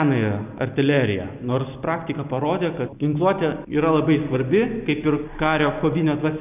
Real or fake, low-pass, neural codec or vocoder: real; 3.6 kHz; none